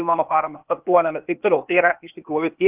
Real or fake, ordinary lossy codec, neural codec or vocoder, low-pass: fake; Opus, 24 kbps; codec, 16 kHz, 0.8 kbps, ZipCodec; 3.6 kHz